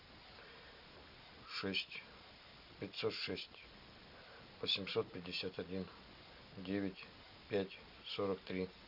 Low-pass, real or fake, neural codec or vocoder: 5.4 kHz; real; none